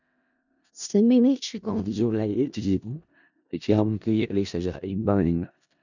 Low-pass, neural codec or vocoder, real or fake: 7.2 kHz; codec, 16 kHz in and 24 kHz out, 0.4 kbps, LongCat-Audio-Codec, four codebook decoder; fake